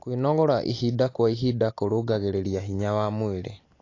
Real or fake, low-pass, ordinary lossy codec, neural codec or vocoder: real; 7.2 kHz; AAC, 32 kbps; none